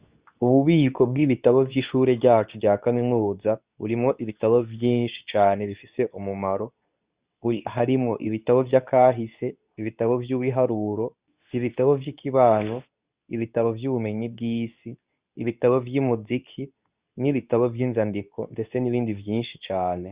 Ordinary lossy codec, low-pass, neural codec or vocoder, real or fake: Opus, 64 kbps; 3.6 kHz; codec, 24 kHz, 0.9 kbps, WavTokenizer, medium speech release version 2; fake